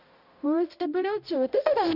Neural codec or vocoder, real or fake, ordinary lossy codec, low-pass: codec, 16 kHz, 0.5 kbps, X-Codec, HuBERT features, trained on balanced general audio; fake; none; 5.4 kHz